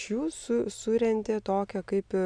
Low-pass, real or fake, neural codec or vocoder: 9.9 kHz; real; none